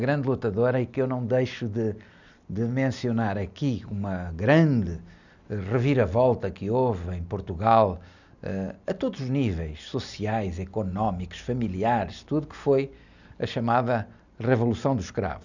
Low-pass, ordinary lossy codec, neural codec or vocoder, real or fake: 7.2 kHz; none; none; real